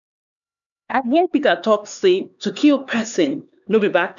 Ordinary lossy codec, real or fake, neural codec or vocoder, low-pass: none; fake; codec, 16 kHz, 4 kbps, X-Codec, HuBERT features, trained on LibriSpeech; 7.2 kHz